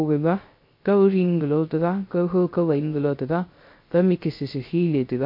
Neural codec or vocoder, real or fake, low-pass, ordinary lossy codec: codec, 16 kHz, 0.3 kbps, FocalCodec; fake; 5.4 kHz; MP3, 32 kbps